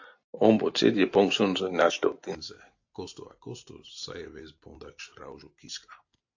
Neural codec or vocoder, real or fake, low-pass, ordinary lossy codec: none; real; 7.2 kHz; AAC, 48 kbps